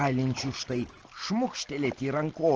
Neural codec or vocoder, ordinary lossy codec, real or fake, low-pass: none; Opus, 16 kbps; real; 7.2 kHz